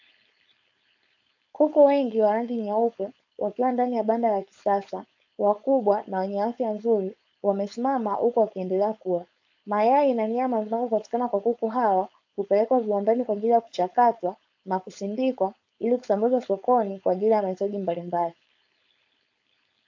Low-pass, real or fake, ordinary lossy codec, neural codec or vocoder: 7.2 kHz; fake; AAC, 48 kbps; codec, 16 kHz, 4.8 kbps, FACodec